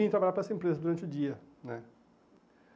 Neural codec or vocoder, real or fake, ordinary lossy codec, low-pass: none; real; none; none